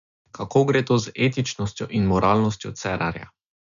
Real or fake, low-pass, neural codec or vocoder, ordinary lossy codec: real; 7.2 kHz; none; none